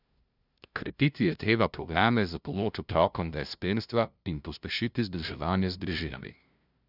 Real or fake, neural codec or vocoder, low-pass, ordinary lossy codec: fake; codec, 16 kHz, 0.5 kbps, FunCodec, trained on LibriTTS, 25 frames a second; 5.4 kHz; none